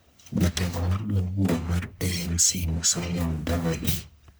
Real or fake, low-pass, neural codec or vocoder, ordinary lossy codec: fake; none; codec, 44.1 kHz, 1.7 kbps, Pupu-Codec; none